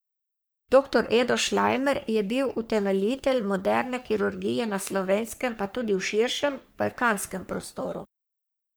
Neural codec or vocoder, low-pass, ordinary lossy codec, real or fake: codec, 44.1 kHz, 3.4 kbps, Pupu-Codec; none; none; fake